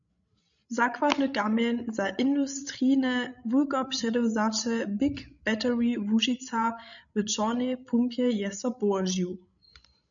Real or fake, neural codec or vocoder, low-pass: fake; codec, 16 kHz, 16 kbps, FreqCodec, larger model; 7.2 kHz